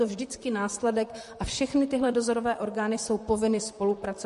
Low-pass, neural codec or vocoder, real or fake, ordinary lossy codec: 14.4 kHz; vocoder, 44.1 kHz, 128 mel bands, Pupu-Vocoder; fake; MP3, 48 kbps